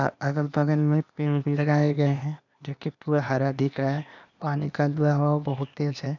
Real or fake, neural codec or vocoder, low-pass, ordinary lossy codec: fake; codec, 16 kHz, 0.8 kbps, ZipCodec; 7.2 kHz; none